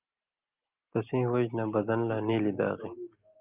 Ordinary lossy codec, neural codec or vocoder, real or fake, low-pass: Opus, 24 kbps; none; real; 3.6 kHz